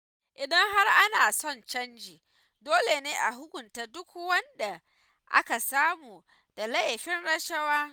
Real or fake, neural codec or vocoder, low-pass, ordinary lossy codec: real; none; none; none